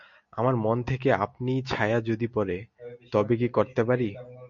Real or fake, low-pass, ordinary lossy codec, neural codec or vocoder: real; 7.2 kHz; MP3, 48 kbps; none